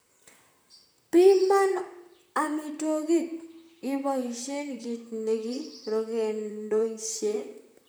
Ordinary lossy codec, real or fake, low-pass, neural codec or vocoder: none; fake; none; vocoder, 44.1 kHz, 128 mel bands, Pupu-Vocoder